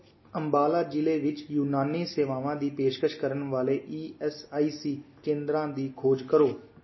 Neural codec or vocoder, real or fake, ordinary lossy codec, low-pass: none; real; MP3, 24 kbps; 7.2 kHz